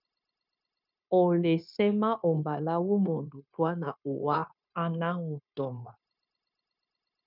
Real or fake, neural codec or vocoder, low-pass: fake; codec, 16 kHz, 0.9 kbps, LongCat-Audio-Codec; 5.4 kHz